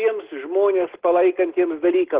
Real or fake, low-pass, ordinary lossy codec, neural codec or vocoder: real; 3.6 kHz; Opus, 16 kbps; none